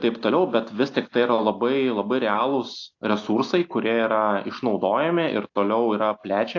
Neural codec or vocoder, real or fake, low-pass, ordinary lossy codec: none; real; 7.2 kHz; AAC, 48 kbps